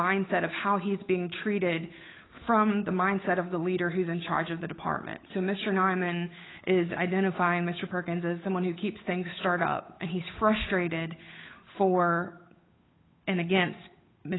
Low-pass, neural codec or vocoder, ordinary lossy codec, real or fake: 7.2 kHz; codec, 16 kHz, 8 kbps, FunCodec, trained on Chinese and English, 25 frames a second; AAC, 16 kbps; fake